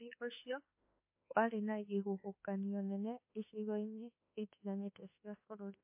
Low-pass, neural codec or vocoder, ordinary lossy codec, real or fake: 3.6 kHz; autoencoder, 48 kHz, 32 numbers a frame, DAC-VAE, trained on Japanese speech; MP3, 24 kbps; fake